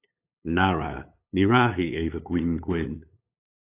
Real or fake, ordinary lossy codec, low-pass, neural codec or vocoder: fake; AAC, 24 kbps; 3.6 kHz; codec, 16 kHz, 8 kbps, FunCodec, trained on LibriTTS, 25 frames a second